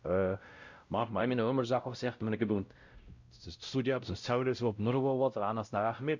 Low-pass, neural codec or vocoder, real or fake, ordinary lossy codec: 7.2 kHz; codec, 16 kHz, 0.5 kbps, X-Codec, WavLM features, trained on Multilingual LibriSpeech; fake; Opus, 64 kbps